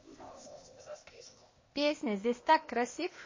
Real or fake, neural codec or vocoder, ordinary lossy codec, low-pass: fake; codec, 16 kHz, 0.8 kbps, ZipCodec; MP3, 32 kbps; 7.2 kHz